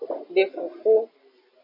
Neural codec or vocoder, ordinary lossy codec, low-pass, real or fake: none; MP3, 24 kbps; 5.4 kHz; real